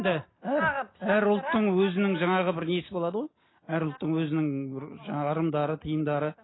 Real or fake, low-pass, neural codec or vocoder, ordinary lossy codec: real; 7.2 kHz; none; AAC, 16 kbps